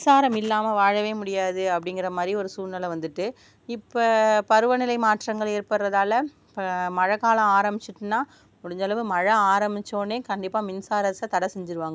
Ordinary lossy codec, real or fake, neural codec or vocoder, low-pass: none; real; none; none